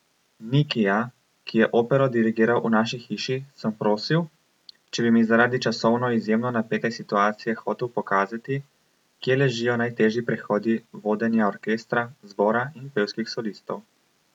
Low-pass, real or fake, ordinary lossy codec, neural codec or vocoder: 19.8 kHz; real; none; none